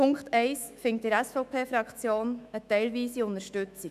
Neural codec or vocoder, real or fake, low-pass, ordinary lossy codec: autoencoder, 48 kHz, 128 numbers a frame, DAC-VAE, trained on Japanese speech; fake; 14.4 kHz; none